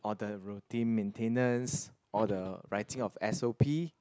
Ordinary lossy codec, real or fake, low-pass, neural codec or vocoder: none; real; none; none